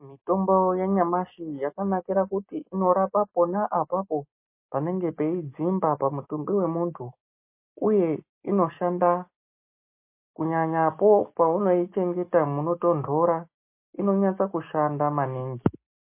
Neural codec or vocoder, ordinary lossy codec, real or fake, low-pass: none; MP3, 24 kbps; real; 3.6 kHz